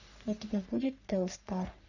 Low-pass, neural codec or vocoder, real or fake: 7.2 kHz; codec, 44.1 kHz, 3.4 kbps, Pupu-Codec; fake